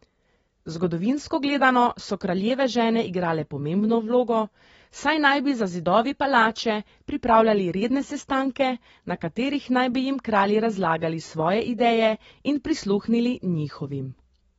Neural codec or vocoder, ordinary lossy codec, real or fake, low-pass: none; AAC, 24 kbps; real; 19.8 kHz